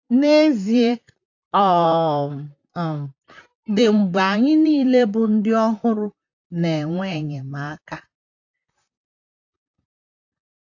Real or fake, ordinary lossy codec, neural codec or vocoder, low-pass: fake; AAC, 48 kbps; vocoder, 44.1 kHz, 128 mel bands, Pupu-Vocoder; 7.2 kHz